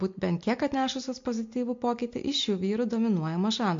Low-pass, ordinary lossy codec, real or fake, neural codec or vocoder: 7.2 kHz; MP3, 48 kbps; real; none